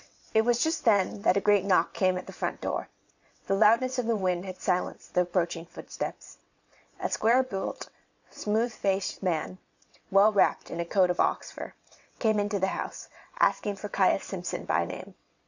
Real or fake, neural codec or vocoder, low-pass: fake; vocoder, 22.05 kHz, 80 mel bands, WaveNeXt; 7.2 kHz